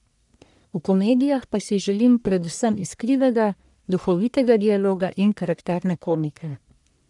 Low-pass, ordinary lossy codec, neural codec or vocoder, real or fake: 10.8 kHz; none; codec, 44.1 kHz, 1.7 kbps, Pupu-Codec; fake